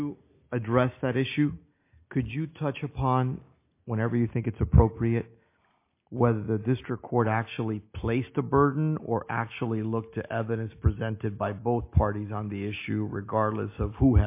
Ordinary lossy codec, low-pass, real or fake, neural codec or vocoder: MP3, 24 kbps; 3.6 kHz; real; none